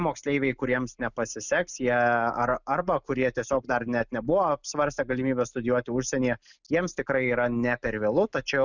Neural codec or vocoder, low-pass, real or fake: none; 7.2 kHz; real